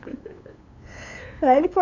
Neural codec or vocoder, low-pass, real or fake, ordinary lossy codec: codec, 16 kHz, 8 kbps, FunCodec, trained on LibriTTS, 25 frames a second; 7.2 kHz; fake; none